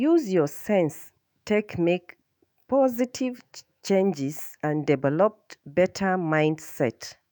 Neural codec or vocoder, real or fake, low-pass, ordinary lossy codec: autoencoder, 48 kHz, 128 numbers a frame, DAC-VAE, trained on Japanese speech; fake; none; none